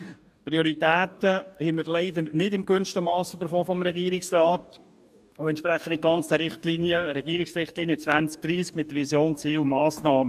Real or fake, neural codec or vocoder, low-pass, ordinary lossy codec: fake; codec, 44.1 kHz, 2.6 kbps, DAC; 14.4 kHz; AAC, 96 kbps